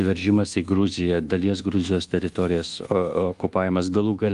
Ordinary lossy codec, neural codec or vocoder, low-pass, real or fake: Opus, 32 kbps; codec, 24 kHz, 1.2 kbps, DualCodec; 10.8 kHz; fake